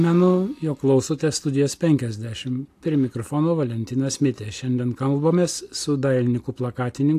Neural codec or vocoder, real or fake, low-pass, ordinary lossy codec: none; real; 14.4 kHz; AAC, 64 kbps